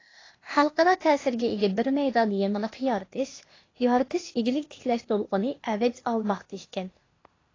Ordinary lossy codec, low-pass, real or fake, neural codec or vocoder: AAC, 32 kbps; 7.2 kHz; fake; codec, 16 kHz, 0.8 kbps, ZipCodec